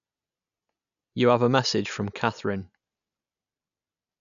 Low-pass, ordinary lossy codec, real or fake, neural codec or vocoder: 7.2 kHz; none; real; none